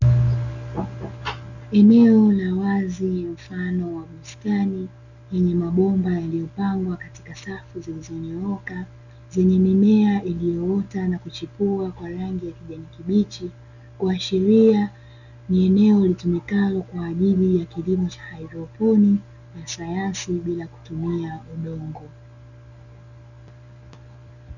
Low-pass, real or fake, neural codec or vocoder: 7.2 kHz; real; none